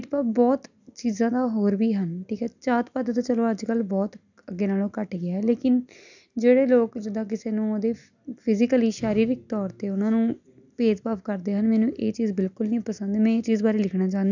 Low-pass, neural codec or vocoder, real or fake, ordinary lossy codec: 7.2 kHz; none; real; none